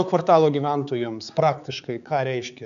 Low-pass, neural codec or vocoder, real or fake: 7.2 kHz; codec, 16 kHz, 4 kbps, X-Codec, HuBERT features, trained on balanced general audio; fake